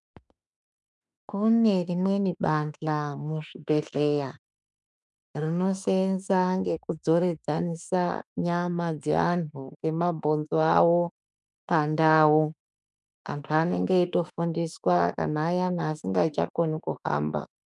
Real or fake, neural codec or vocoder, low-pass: fake; autoencoder, 48 kHz, 32 numbers a frame, DAC-VAE, trained on Japanese speech; 10.8 kHz